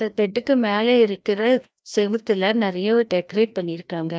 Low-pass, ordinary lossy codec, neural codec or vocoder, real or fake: none; none; codec, 16 kHz, 1 kbps, FreqCodec, larger model; fake